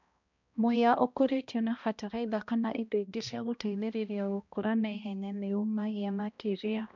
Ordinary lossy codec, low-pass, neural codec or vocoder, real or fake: none; 7.2 kHz; codec, 16 kHz, 1 kbps, X-Codec, HuBERT features, trained on balanced general audio; fake